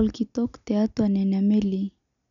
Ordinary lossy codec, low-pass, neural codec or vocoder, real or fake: none; 7.2 kHz; none; real